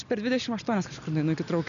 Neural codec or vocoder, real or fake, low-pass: none; real; 7.2 kHz